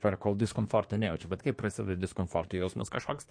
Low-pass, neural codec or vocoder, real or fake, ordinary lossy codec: 9.9 kHz; codec, 24 kHz, 1 kbps, SNAC; fake; MP3, 48 kbps